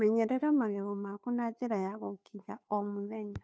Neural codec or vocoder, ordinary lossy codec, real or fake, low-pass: codec, 16 kHz, 2 kbps, FunCodec, trained on Chinese and English, 25 frames a second; none; fake; none